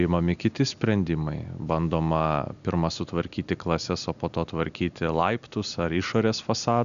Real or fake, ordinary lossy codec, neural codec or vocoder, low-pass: real; MP3, 96 kbps; none; 7.2 kHz